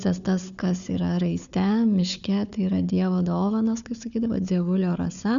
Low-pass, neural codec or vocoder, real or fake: 7.2 kHz; codec, 16 kHz, 4 kbps, FunCodec, trained on Chinese and English, 50 frames a second; fake